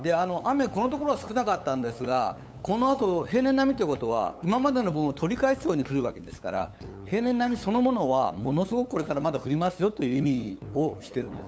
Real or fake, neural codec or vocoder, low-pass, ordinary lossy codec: fake; codec, 16 kHz, 8 kbps, FunCodec, trained on LibriTTS, 25 frames a second; none; none